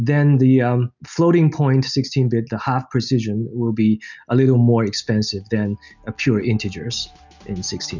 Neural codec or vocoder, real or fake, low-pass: none; real; 7.2 kHz